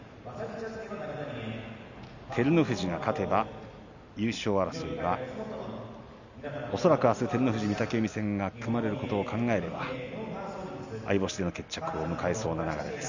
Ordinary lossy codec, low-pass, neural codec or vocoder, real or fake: none; 7.2 kHz; none; real